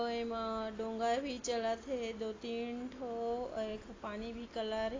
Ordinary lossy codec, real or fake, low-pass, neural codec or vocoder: AAC, 32 kbps; real; 7.2 kHz; none